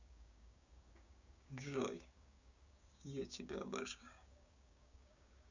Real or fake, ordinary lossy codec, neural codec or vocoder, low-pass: fake; Opus, 64 kbps; codec, 16 kHz, 6 kbps, DAC; 7.2 kHz